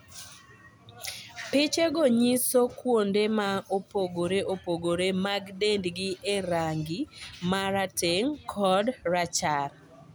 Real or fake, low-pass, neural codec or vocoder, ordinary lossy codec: real; none; none; none